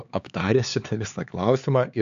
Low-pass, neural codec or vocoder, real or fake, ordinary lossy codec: 7.2 kHz; codec, 16 kHz, 4 kbps, X-Codec, HuBERT features, trained on LibriSpeech; fake; AAC, 96 kbps